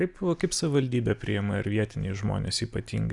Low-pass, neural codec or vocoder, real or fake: 10.8 kHz; none; real